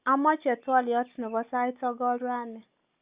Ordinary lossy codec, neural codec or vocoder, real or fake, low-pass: Opus, 64 kbps; none; real; 3.6 kHz